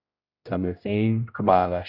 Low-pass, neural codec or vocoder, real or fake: 5.4 kHz; codec, 16 kHz, 0.5 kbps, X-Codec, HuBERT features, trained on general audio; fake